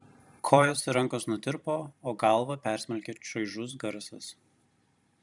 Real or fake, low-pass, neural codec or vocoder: fake; 10.8 kHz; vocoder, 44.1 kHz, 128 mel bands every 512 samples, BigVGAN v2